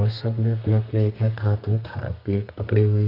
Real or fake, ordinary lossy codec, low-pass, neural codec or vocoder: fake; none; 5.4 kHz; codec, 32 kHz, 1.9 kbps, SNAC